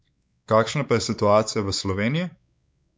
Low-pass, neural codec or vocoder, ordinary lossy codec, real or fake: none; codec, 16 kHz, 4 kbps, X-Codec, WavLM features, trained on Multilingual LibriSpeech; none; fake